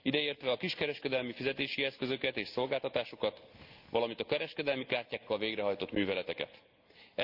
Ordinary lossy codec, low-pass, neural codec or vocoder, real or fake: Opus, 32 kbps; 5.4 kHz; none; real